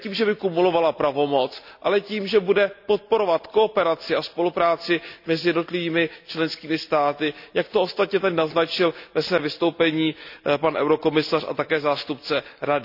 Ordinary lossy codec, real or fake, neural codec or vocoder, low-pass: none; real; none; 5.4 kHz